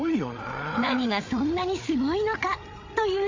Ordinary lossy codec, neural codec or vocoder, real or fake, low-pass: MP3, 64 kbps; codec, 16 kHz, 8 kbps, FreqCodec, larger model; fake; 7.2 kHz